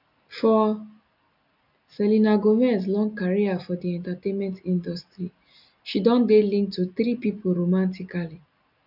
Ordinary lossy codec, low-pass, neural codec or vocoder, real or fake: none; 5.4 kHz; none; real